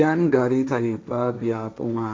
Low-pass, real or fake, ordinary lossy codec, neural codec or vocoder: none; fake; none; codec, 16 kHz, 1.1 kbps, Voila-Tokenizer